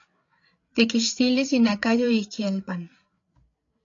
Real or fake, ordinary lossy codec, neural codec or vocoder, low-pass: fake; AAC, 48 kbps; codec, 16 kHz, 8 kbps, FreqCodec, larger model; 7.2 kHz